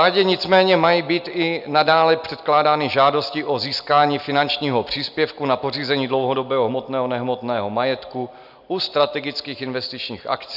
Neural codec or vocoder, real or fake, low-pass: none; real; 5.4 kHz